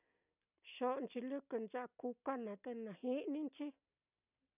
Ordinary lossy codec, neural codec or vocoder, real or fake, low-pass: none; none; real; 3.6 kHz